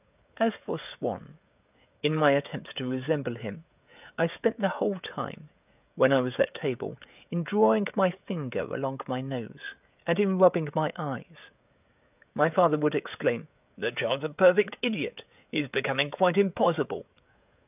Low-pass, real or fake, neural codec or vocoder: 3.6 kHz; fake; codec, 16 kHz, 16 kbps, FreqCodec, smaller model